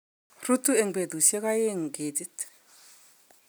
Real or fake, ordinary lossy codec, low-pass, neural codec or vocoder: real; none; none; none